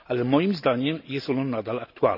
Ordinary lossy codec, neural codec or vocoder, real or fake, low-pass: none; none; real; 5.4 kHz